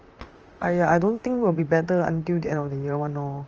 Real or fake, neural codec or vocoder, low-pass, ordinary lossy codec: fake; vocoder, 44.1 kHz, 128 mel bands, Pupu-Vocoder; 7.2 kHz; Opus, 24 kbps